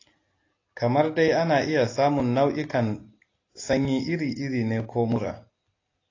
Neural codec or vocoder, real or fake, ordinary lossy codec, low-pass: none; real; AAC, 32 kbps; 7.2 kHz